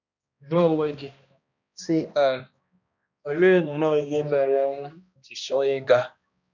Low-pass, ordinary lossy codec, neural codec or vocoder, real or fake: 7.2 kHz; none; codec, 16 kHz, 1 kbps, X-Codec, HuBERT features, trained on balanced general audio; fake